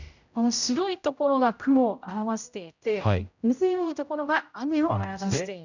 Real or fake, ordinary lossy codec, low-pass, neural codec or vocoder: fake; none; 7.2 kHz; codec, 16 kHz, 0.5 kbps, X-Codec, HuBERT features, trained on general audio